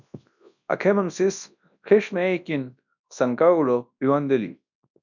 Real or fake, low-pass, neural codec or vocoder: fake; 7.2 kHz; codec, 24 kHz, 0.9 kbps, WavTokenizer, large speech release